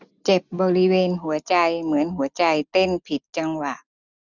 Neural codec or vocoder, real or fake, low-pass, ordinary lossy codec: none; real; 7.2 kHz; none